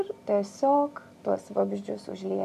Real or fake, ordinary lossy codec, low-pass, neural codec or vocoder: real; AAC, 96 kbps; 14.4 kHz; none